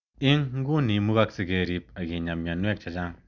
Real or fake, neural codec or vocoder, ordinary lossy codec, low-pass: real; none; none; 7.2 kHz